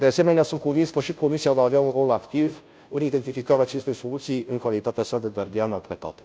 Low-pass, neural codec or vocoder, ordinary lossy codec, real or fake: none; codec, 16 kHz, 0.5 kbps, FunCodec, trained on Chinese and English, 25 frames a second; none; fake